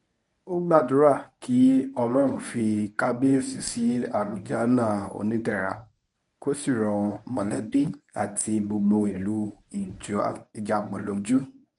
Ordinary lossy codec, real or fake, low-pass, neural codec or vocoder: none; fake; 10.8 kHz; codec, 24 kHz, 0.9 kbps, WavTokenizer, medium speech release version 1